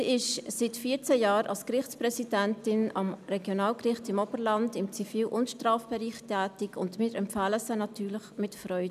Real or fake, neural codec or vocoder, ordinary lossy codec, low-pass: real; none; none; 14.4 kHz